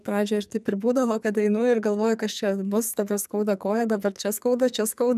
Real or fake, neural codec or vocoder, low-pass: fake; codec, 44.1 kHz, 2.6 kbps, SNAC; 14.4 kHz